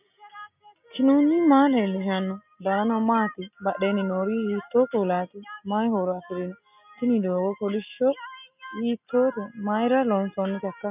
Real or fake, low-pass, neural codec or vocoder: real; 3.6 kHz; none